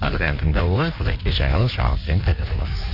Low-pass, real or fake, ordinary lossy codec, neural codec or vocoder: 5.4 kHz; fake; none; codec, 16 kHz in and 24 kHz out, 1.1 kbps, FireRedTTS-2 codec